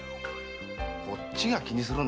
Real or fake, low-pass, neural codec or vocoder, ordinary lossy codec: real; none; none; none